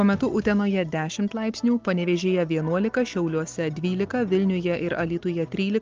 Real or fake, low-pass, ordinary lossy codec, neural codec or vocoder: real; 7.2 kHz; Opus, 32 kbps; none